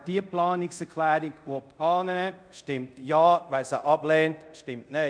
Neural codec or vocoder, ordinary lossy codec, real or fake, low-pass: codec, 24 kHz, 0.5 kbps, DualCodec; none; fake; 9.9 kHz